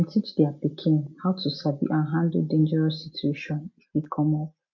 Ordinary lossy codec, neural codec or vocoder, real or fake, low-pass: MP3, 64 kbps; none; real; 7.2 kHz